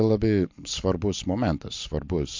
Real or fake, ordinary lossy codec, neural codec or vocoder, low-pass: real; MP3, 48 kbps; none; 7.2 kHz